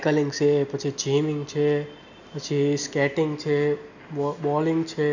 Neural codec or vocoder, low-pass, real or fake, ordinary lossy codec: none; 7.2 kHz; real; none